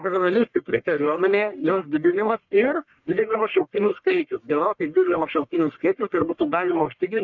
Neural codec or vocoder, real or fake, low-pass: codec, 44.1 kHz, 1.7 kbps, Pupu-Codec; fake; 7.2 kHz